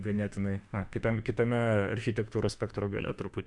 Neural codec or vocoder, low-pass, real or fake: autoencoder, 48 kHz, 32 numbers a frame, DAC-VAE, trained on Japanese speech; 10.8 kHz; fake